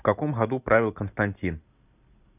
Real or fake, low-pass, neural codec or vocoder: real; 3.6 kHz; none